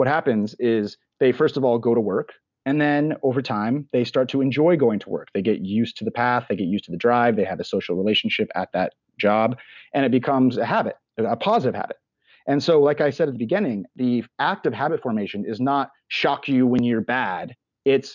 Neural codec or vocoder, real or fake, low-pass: none; real; 7.2 kHz